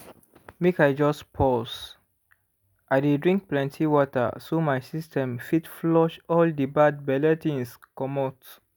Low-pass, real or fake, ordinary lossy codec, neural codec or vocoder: none; real; none; none